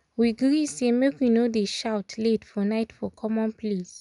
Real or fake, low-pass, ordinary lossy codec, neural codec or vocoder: real; 10.8 kHz; none; none